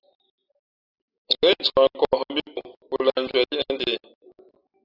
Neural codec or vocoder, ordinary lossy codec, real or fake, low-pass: none; AAC, 32 kbps; real; 5.4 kHz